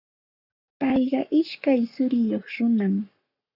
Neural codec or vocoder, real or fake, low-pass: codec, 44.1 kHz, 7.8 kbps, Pupu-Codec; fake; 5.4 kHz